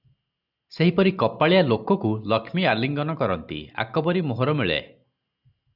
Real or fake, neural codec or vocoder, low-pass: real; none; 5.4 kHz